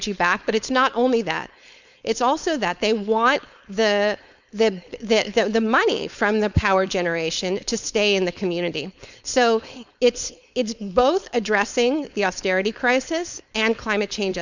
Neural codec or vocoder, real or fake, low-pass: codec, 16 kHz, 4.8 kbps, FACodec; fake; 7.2 kHz